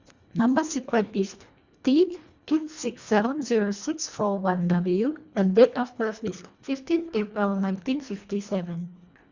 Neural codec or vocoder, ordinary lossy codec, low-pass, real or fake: codec, 24 kHz, 1.5 kbps, HILCodec; Opus, 64 kbps; 7.2 kHz; fake